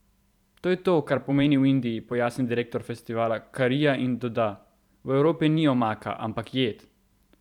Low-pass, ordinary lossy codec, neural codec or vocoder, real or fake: 19.8 kHz; none; vocoder, 44.1 kHz, 128 mel bands every 512 samples, BigVGAN v2; fake